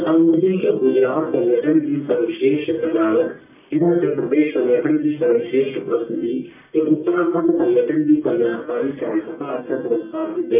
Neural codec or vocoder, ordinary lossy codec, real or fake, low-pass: codec, 44.1 kHz, 1.7 kbps, Pupu-Codec; none; fake; 3.6 kHz